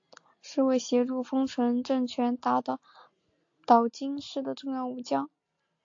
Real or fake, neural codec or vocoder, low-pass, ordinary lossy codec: real; none; 7.2 kHz; AAC, 48 kbps